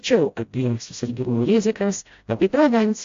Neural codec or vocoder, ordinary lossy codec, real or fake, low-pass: codec, 16 kHz, 0.5 kbps, FreqCodec, smaller model; AAC, 48 kbps; fake; 7.2 kHz